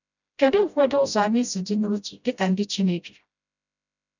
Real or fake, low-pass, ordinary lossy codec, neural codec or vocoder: fake; 7.2 kHz; none; codec, 16 kHz, 0.5 kbps, FreqCodec, smaller model